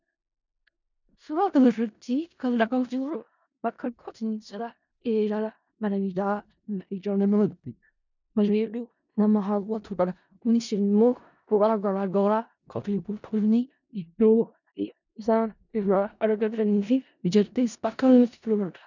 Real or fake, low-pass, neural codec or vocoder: fake; 7.2 kHz; codec, 16 kHz in and 24 kHz out, 0.4 kbps, LongCat-Audio-Codec, four codebook decoder